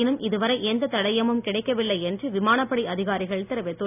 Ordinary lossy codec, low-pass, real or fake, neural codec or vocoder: none; 3.6 kHz; real; none